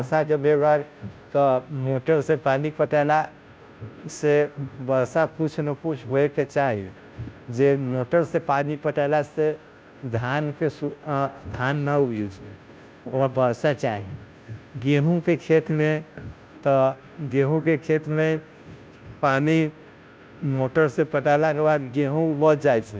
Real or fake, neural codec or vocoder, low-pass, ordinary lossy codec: fake; codec, 16 kHz, 0.5 kbps, FunCodec, trained on Chinese and English, 25 frames a second; none; none